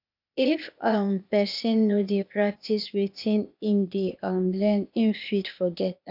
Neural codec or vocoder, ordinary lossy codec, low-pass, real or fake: codec, 16 kHz, 0.8 kbps, ZipCodec; none; 5.4 kHz; fake